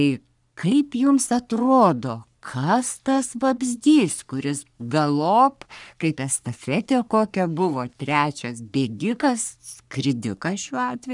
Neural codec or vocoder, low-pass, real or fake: codec, 44.1 kHz, 3.4 kbps, Pupu-Codec; 10.8 kHz; fake